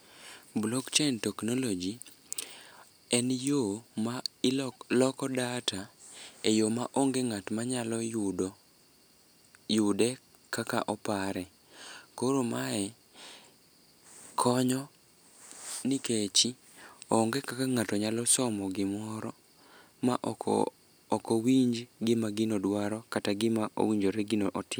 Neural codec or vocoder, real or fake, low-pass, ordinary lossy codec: none; real; none; none